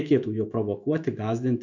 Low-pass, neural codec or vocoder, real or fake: 7.2 kHz; autoencoder, 48 kHz, 128 numbers a frame, DAC-VAE, trained on Japanese speech; fake